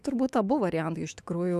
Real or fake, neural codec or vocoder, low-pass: fake; autoencoder, 48 kHz, 128 numbers a frame, DAC-VAE, trained on Japanese speech; 14.4 kHz